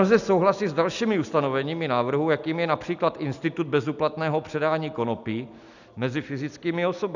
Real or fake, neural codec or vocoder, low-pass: real; none; 7.2 kHz